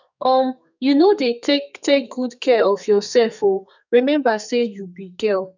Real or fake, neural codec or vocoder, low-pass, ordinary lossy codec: fake; codec, 32 kHz, 1.9 kbps, SNAC; 7.2 kHz; none